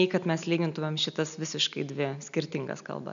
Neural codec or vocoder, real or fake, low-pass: none; real; 7.2 kHz